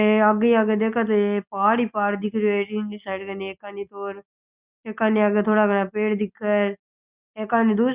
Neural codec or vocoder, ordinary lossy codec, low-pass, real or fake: none; Opus, 64 kbps; 3.6 kHz; real